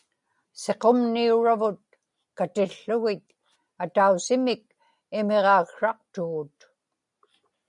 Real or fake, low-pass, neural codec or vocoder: real; 10.8 kHz; none